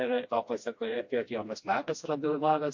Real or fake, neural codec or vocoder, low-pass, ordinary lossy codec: fake; codec, 16 kHz, 1 kbps, FreqCodec, smaller model; 7.2 kHz; MP3, 48 kbps